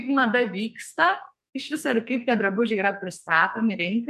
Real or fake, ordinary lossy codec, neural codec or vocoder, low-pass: fake; MP3, 64 kbps; codec, 44.1 kHz, 2.6 kbps, SNAC; 14.4 kHz